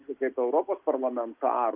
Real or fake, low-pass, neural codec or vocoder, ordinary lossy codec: real; 3.6 kHz; none; Opus, 24 kbps